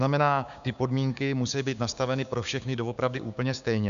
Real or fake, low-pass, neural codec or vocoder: fake; 7.2 kHz; codec, 16 kHz, 6 kbps, DAC